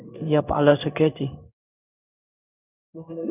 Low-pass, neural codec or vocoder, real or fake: 3.6 kHz; codec, 16 kHz in and 24 kHz out, 1 kbps, XY-Tokenizer; fake